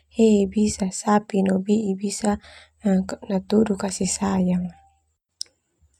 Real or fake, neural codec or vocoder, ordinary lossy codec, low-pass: real; none; none; 19.8 kHz